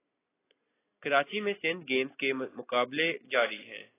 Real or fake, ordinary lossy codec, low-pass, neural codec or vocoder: real; AAC, 16 kbps; 3.6 kHz; none